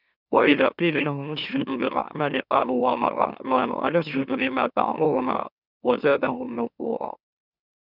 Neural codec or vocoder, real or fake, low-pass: autoencoder, 44.1 kHz, a latent of 192 numbers a frame, MeloTTS; fake; 5.4 kHz